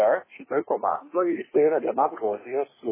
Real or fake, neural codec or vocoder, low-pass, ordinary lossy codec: fake; codec, 24 kHz, 1 kbps, SNAC; 3.6 kHz; MP3, 16 kbps